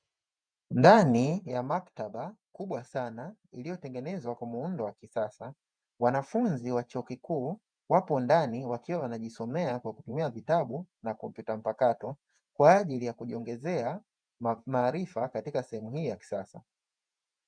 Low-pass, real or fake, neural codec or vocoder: 9.9 kHz; fake; vocoder, 48 kHz, 128 mel bands, Vocos